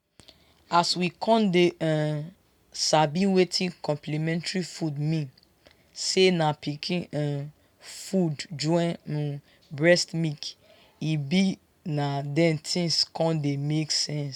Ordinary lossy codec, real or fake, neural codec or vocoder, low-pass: none; real; none; 19.8 kHz